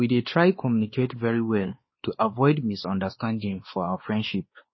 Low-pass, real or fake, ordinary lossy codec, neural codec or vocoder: 7.2 kHz; fake; MP3, 24 kbps; codec, 16 kHz, 2 kbps, X-Codec, WavLM features, trained on Multilingual LibriSpeech